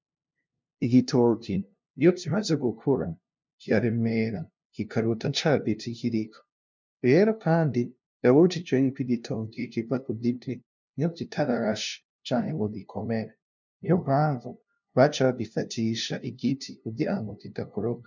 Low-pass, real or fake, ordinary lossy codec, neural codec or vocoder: 7.2 kHz; fake; MP3, 64 kbps; codec, 16 kHz, 0.5 kbps, FunCodec, trained on LibriTTS, 25 frames a second